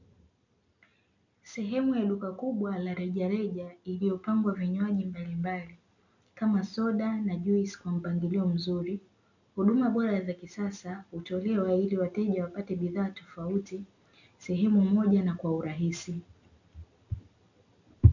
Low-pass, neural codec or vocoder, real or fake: 7.2 kHz; none; real